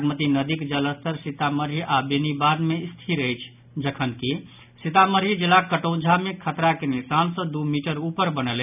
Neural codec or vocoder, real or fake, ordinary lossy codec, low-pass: none; real; none; 3.6 kHz